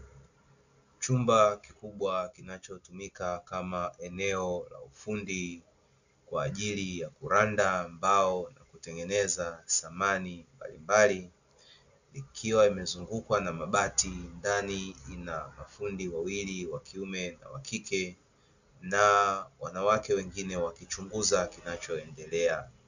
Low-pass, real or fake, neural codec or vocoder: 7.2 kHz; real; none